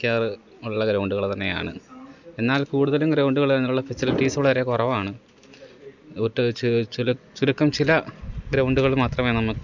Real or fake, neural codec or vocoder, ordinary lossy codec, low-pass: real; none; none; 7.2 kHz